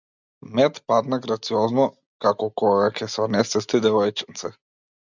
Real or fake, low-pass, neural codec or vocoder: real; 7.2 kHz; none